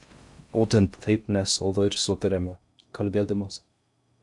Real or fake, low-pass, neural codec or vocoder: fake; 10.8 kHz; codec, 16 kHz in and 24 kHz out, 0.6 kbps, FocalCodec, streaming, 4096 codes